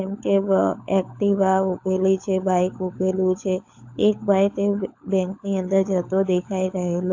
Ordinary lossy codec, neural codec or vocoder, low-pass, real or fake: AAC, 48 kbps; codec, 16 kHz, 16 kbps, FunCodec, trained on LibriTTS, 50 frames a second; 7.2 kHz; fake